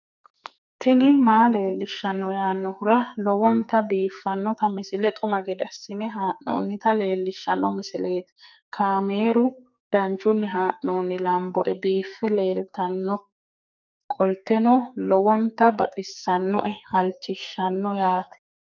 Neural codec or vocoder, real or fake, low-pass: codec, 44.1 kHz, 2.6 kbps, SNAC; fake; 7.2 kHz